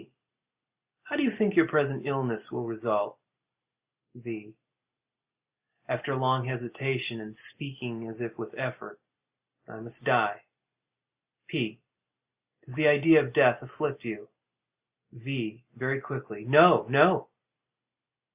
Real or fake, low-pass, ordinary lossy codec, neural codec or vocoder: real; 3.6 kHz; Opus, 64 kbps; none